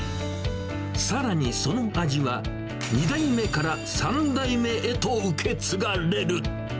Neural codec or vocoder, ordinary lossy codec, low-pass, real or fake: none; none; none; real